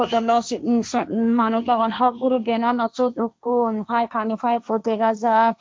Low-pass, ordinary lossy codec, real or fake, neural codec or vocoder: none; none; fake; codec, 16 kHz, 1.1 kbps, Voila-Tokenizer